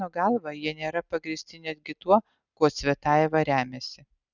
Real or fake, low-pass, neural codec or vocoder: real; 7.2 kHz; none